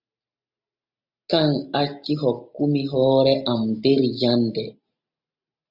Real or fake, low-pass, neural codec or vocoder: real; 5.4 kHz; none